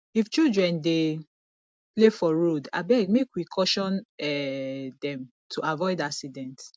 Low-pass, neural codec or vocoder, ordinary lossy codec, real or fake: none; none; none; real